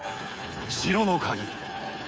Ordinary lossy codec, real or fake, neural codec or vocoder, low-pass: none; fake; codec, 16 kHz, 8 kbps, FreqCodec, smaller model; none